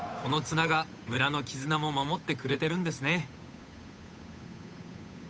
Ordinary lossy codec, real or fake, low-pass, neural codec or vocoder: none; fake; none; codec, 16 kHz, 8 kbps, FunCodec, trained on Chinese and English, 25 frames a second